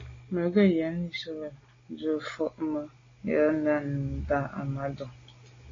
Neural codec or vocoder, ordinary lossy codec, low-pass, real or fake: none; AAC, 32 kbps; 7.2 kHz; real